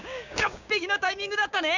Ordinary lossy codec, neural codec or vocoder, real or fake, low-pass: none; codec, 16 kHz, 6 kbps, DAC; fake; 7.2 kHz